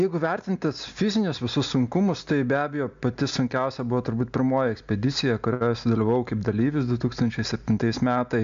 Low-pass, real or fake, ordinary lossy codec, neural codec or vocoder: 7.2 kHz; real; MP3, 64 kbps; none